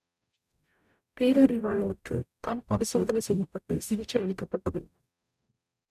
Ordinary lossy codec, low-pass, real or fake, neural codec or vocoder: none; 14.4 kHz; fake; codec, 44.1 kHz, 0.9 kbps, DAC